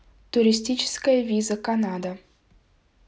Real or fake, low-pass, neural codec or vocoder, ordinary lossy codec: real; none; none; none